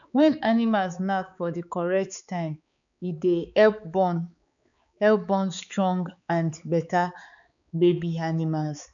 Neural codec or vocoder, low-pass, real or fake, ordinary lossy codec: codec, 16 kHz, 4 kbps, X-Codec, HuBERT features, trained on balanced general audio; 7.2 kHz; fake; none